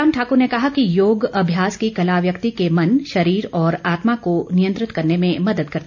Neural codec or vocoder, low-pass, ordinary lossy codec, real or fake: none; 7.2 kHz; none; real